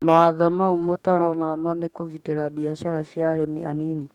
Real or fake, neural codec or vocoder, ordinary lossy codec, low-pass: fake; codec, 44.1 kHz, 2.6 kbps, DAC; none; 19.8 kHz